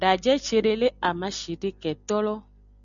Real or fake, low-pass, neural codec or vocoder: real; 7.2 kHz; none